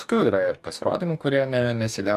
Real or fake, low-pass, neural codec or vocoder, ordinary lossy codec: fake; 14.4 kHz; codec, 44.1 kHz, 2.6 kbps, DAC; AAC, 96 kbps